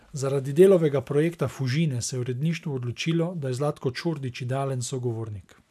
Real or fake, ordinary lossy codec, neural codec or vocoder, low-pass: real; none; none; 14.4 kHz